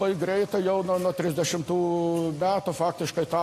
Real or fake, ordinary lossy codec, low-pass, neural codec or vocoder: real; AAC, 48 kbps; 14.4 kHz; none